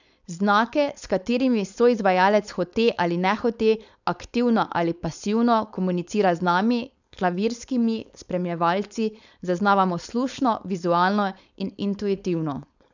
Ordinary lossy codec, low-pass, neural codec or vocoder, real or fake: none; 7.2 kHz; codec, 16 kHz, 4.8 kbps, FACodec; fake